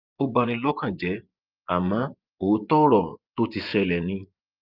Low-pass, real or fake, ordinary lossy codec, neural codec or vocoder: 5.4 kHz; fake; Opus, 24 kbps; vocoder, 24 kHz, 100 mel bands, Vocos